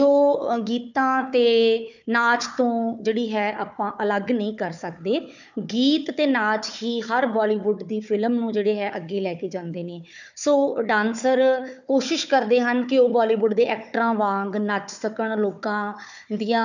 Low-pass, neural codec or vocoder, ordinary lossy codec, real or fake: 7.2 kHz; codec, 16 kHz, 4 kbps, FunCodec, trained on Chinese and English, 50 frames a second; none; fake